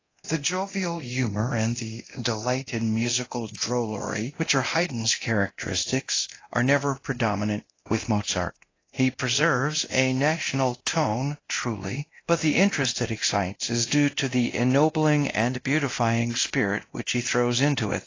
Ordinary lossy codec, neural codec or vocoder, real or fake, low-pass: AAC, 32 kbps; codec, 24 kHz, 0.9 kbps, DualCodec; fake; 7.2 kHz